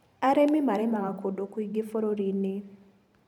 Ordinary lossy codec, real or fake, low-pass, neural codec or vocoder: none; fake; 19.8 kHz; vocoder, 44.1 kHz, 128 mel bands every 512 samples, BigVGAN v2